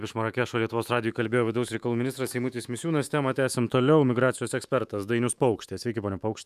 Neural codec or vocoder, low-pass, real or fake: autoencoder, 48 kHz, 128 numbers a frame, DAC-VAE, trained on Japanese speech; 14.4 kHz; fake